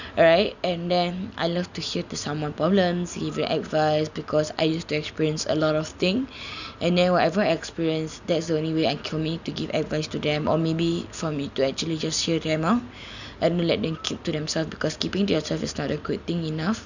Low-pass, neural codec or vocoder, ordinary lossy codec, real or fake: 7.2 kHz; none; none; real